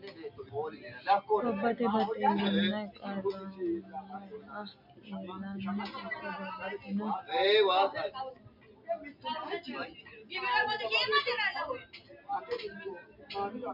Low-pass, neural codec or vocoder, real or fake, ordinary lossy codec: 5.4 kHz; none; real; MP3, 48 kbps